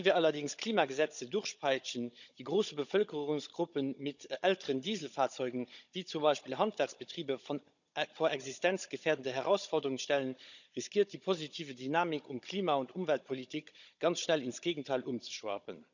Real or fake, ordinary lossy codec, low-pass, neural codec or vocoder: fake; none; 7.2 kHz; codec, 16 kHz, 16 kbps, FunCodec, trained on Chinese and English, 50 frames a second